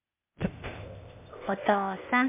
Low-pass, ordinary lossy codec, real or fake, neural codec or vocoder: 3.6 kHz; MP3, 32 kbps; fake; codec, 16 kHz, 0.8 kbps, ZipCodec